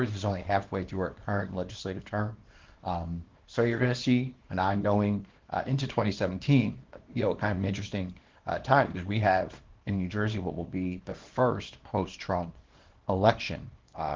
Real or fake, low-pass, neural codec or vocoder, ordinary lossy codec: fake; 7.2 kHz; codec, 24 kHz, 0.9 kbps, WavTokenizer, small release; Opus, 16 kbps